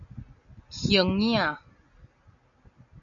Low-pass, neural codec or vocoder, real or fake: 7.2 kHz; none; real